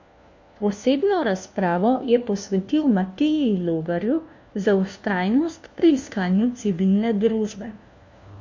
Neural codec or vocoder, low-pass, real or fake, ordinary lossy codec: codec, 16 kHz, 1 kbps, FunCodec, trained on LibriTTS, 50 frames a second; 7.2 kHz; fake; MP3, 48 kbps